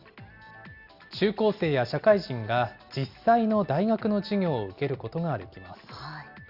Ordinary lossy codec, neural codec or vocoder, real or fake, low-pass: Opus, 64 kbps; none; real; 5.4 kHz